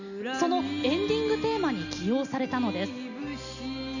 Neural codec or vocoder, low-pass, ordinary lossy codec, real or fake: none; 7.2 kHz; none; real